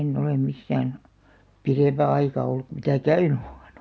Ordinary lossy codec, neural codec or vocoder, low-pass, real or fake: none; none; none; real